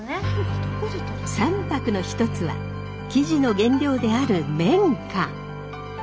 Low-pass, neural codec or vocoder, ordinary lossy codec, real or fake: none; none; none; real